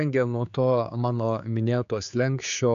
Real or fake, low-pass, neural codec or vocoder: fake; 7.2 kHz; codec, 16 kHz, 4 kbps, X-Codec, HuBERT features, trained on general audio